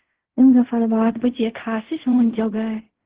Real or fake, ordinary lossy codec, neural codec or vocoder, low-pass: fake; Opus, 16 kbps; codec, 16 kHz in and 24 kHz out, 0.4 kbps, LongCat-Audio-Codec, fine tuned four codebook decoder; 3.6 kHz